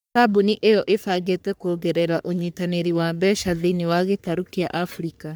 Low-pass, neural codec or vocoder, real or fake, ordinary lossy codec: none; codec, 44.1 kHz, 3.4 kbps, Pupu-Codec; fake; none